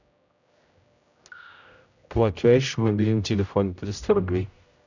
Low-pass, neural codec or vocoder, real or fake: 7.2 kHz; codec, 16 kHz, 0.5 kbps, X-Codec, HuBERT features, trained on general audio; fake